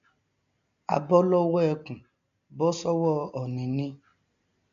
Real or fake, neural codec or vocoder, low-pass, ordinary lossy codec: real; none; 7.2 kHz; none